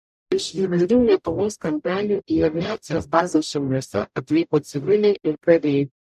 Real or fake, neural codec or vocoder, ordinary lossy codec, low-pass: fake; codec, 44.1 kHz, 0.9 kbps, DAC; MP3, 96 kbps; 14.4 kHz